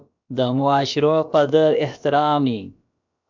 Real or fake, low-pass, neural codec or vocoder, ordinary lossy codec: fake; 7.2 kHz; codec, 16 kHz, about 1 kbps, DyCAST, with the encoder's durations; MP3, 64 kbps